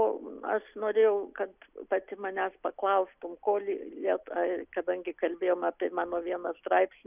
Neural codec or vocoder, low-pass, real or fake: none; 3.6 kHz; real